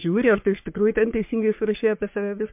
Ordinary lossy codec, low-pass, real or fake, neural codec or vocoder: MP3, 32 kbps; 3.6 kHz; fake; codec, 44.1 kHz, 3.4 kbps, Pupu-Codec